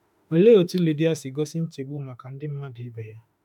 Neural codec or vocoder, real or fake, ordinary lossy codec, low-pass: autoencoder, 48 kHz, 32 numbers a frame, DAC-VAE, trained on Japanese speech; fake; none; 19.8 kHz